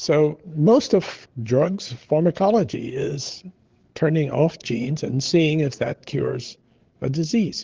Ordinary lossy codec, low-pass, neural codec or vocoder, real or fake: Opus, 16 kbps; 7.2 kHz; codec, 16 kHz, 8 kbps, FreqCodec, larger model; fake